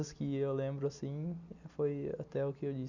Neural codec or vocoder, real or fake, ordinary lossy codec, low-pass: none; real; none; 7.2 kHz